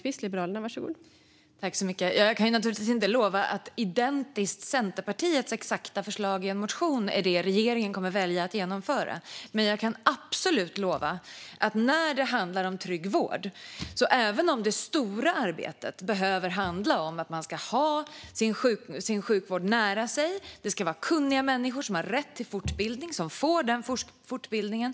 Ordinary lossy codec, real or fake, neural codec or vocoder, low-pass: none; real; none; none